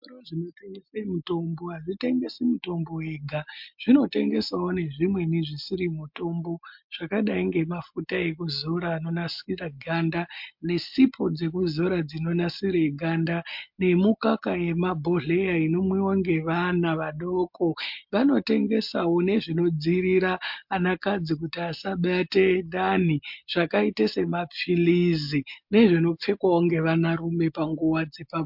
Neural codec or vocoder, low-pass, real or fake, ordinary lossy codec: none; 5.4 kHz; real; MP3, 48 kbps